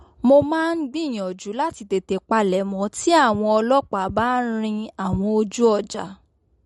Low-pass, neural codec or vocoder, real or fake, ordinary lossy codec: 19.8 kHz; none; real; MP3, 48 kbps